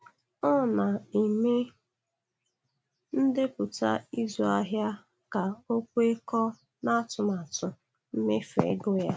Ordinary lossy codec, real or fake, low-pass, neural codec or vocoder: none; real; none; none